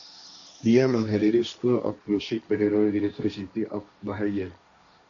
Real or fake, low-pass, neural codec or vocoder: fake; 7.2 kHz; codec, 16 kHz, 1.1 kbps, Voila-Tokenizer